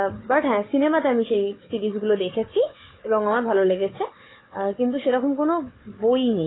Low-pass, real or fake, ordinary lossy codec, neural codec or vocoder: 7.2 kHz; fake; AAC, 16 kbps; codec, 16 kHz, 4 kbps, FunCodec, trained on Chinese and English, 50 frames a second